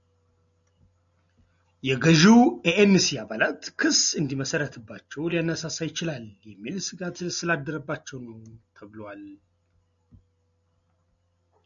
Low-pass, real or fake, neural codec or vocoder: 7.2 kHz; real; none